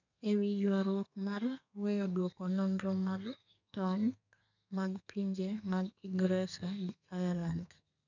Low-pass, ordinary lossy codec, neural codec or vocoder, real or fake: 7.2 kHz; none; codec, 32 kHz, 1.9 kbps, SNAC; fake